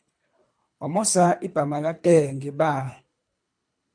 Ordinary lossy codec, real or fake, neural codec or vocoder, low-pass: MP3, 64 kbps; fake; codec, 24 kHz, 3 kbps, HILCodec; 9.9 kHz